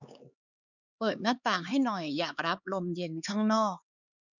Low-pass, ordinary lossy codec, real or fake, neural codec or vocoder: 7.2 kHz; none; fake; codec, 16 kHz, 4 kbps, X-Codec, HuBERT features, trained on LibriSpeech